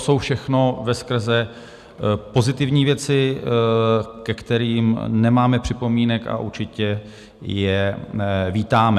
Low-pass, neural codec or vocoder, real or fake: 14.4 kHz; none; real